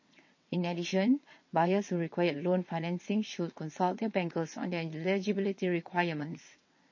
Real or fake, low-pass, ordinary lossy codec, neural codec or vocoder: fake; 7.2 kHz; MP3, 32 kbps; vocoder, 22.05 kHz, 80 mel bands, WaveNeXt